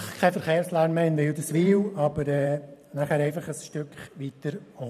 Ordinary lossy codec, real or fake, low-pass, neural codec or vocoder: none; fake; 14.4 kHz; vocoder, 44.1 kHz, 128 mel bands every 512 samples, BigVGAN v2